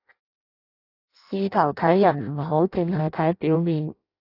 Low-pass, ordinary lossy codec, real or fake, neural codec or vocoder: 5.4 kHz; AAC, 32 kbps; fake; codec, 16 kHz in and 24 kHz out, 0.6 kbps, FireRedTTS-2 codec